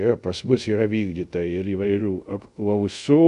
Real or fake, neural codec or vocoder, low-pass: fake; codec, 24 kHz, 0.5 kbps, DualCodec; 10.8 kHz